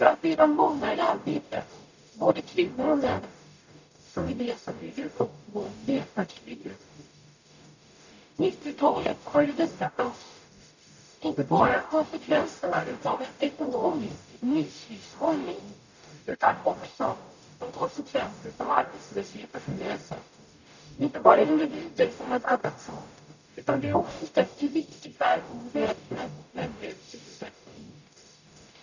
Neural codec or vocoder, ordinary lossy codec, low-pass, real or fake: codec, 44.1 kHz, 0.9 kbps, DAC; none; 7.2 kHz; fake